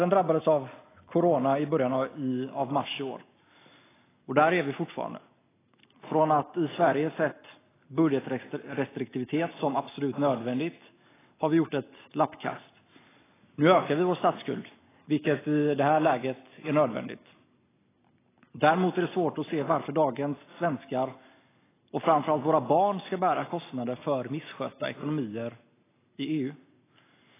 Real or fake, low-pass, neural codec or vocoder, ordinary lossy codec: real; 3.6 kHz; none; AAC, 16 kbps